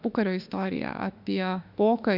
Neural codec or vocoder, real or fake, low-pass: autoencoder, 48 kHz, 32 numbers a frame, DAC-VAE, trained on Japanese speech; fake; 5.4 kHz